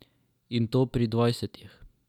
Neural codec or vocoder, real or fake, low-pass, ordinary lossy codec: none; real; 19.8 kHz; none